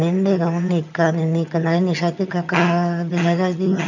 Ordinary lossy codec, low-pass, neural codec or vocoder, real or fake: none; 7.2 kHz; vocoder, 22.05 kHz, 80 mel bands, HiFi-GAN; fake